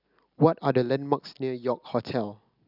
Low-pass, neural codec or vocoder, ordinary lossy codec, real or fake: 5.4 kHz; none; none; real